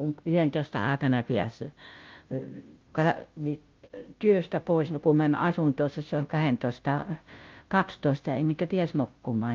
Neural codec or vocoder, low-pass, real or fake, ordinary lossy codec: codec, 16 kHz, 0.5 kbps, FunCodec, trained on Chinese and English, 25 frames a second; 7.2 kHz; fake; Opus, 24 kbps